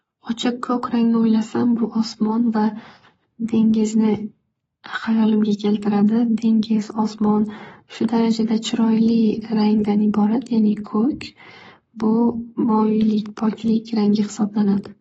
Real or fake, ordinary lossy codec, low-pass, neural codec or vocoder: fake; AAC, 24 kbps; 19.8 kHz; codec, 44.1 kHz, 7.8 kbps, Pupu-Codec